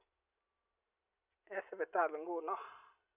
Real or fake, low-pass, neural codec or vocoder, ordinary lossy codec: real; 3.6 kHz; none; none